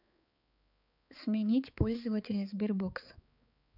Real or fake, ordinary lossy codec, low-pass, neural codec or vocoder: fake; none; 5.4 kHz; codec, 16 kHz, 4 kbps, X-Codec, HuBERT features, trained on balanced general audio